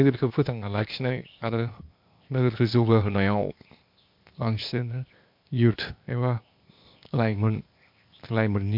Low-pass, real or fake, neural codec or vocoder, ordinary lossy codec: 5.4 kHz; fake; codec, 16 kHz, 0.8 kbps, ZipCodec; MP3, 48 kbps